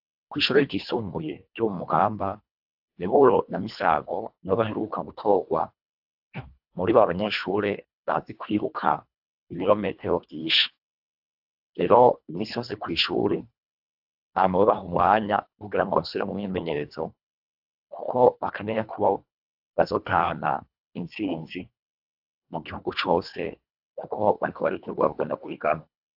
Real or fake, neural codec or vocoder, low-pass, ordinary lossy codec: fake; codec, 24 kHz, 1.5 kbps, HILCodec; 5.4 kHz; AAC, 48 kbps